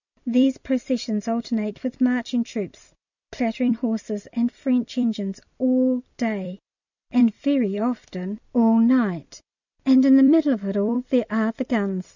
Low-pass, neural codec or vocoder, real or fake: 7.2 kHz; vocoder, 44.1 kHz, 128 mel bands every 256 samples, BigVGAN v2; fake